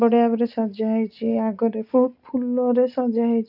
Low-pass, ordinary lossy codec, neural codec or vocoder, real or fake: 5.4 kHz; none; none; real